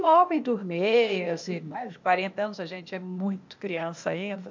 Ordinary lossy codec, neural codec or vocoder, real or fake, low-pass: MP3, 64 kbps; codec, 16 kHz, 0.8 kbps, ZipCodec; fake; 7.2 kHz